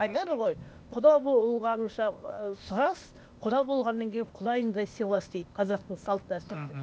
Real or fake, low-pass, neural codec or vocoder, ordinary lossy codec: fake; none; codec, 16 kHz, 0.8 kbps, ZipCodec; none